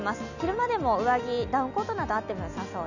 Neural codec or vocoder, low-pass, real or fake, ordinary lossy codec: none; 7.2 kHz; real; none